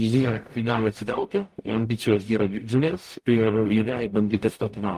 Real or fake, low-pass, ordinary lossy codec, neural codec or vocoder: fake; 14.4 kHz; Opus, 32 kbps; codec, 44.1 kHz, 0.9 kbps, DAC